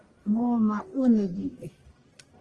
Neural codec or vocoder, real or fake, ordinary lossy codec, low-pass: codec, 44.1 kHz, 1.7 kbps, Pupu-Codec; fake; Opus, 24 kbps; 10.8 kHz